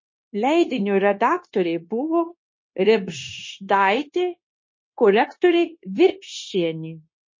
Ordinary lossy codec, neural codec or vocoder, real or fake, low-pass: MP3, 32 kbps; codec, 16 kHz, 0.9 kbps, LongCat-Audio-Codec; fake; 7.2 kHz